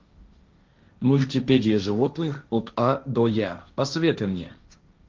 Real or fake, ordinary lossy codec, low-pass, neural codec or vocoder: fake; Opus, 24 kbps; 7.2 kHz; codec, 16 kHz, 1.1 kbps, Voila-Tokenizer